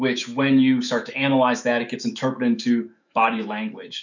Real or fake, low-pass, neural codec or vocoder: fake; 7.2 kHz; autoencoder, 48 kHz, 128 numbers a frame, DAC-VAE, trained on Japanese speech